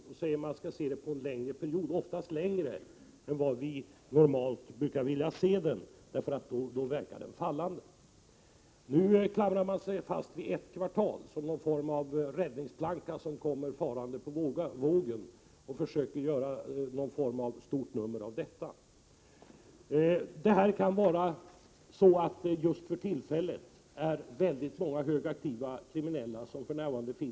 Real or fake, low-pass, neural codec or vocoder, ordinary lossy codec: real; none; none; none